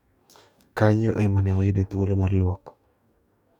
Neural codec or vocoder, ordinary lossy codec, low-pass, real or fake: codec, 44.1 kHz, 2.6 kbps, DAC; none; 19.8 kHz; fake